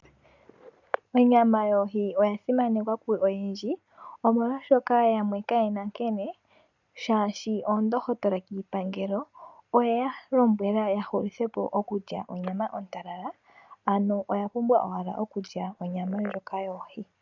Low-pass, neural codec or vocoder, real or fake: 7.2 kHz; none; real